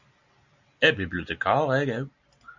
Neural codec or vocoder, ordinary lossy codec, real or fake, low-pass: none; AAC, 48 kbps; real; 7.2 kHz